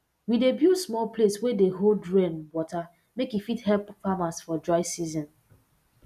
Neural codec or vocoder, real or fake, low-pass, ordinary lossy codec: none; real; 14.4 kHz; none